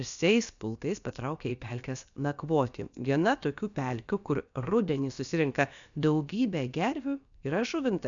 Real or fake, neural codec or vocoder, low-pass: fake; codec, 16 kHz, about 1 kbps, DyCAST, with the encoder's durations; 7.2 kHz